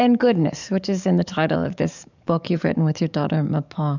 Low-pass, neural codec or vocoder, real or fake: 7.2 kHz; codec, 44.1 kHz, 7.8 kbps, DAC; fake